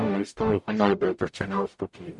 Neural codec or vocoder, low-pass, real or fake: codec, 44.1 kHz, 0.9 kbps, DAC; 10.8 kHz; fake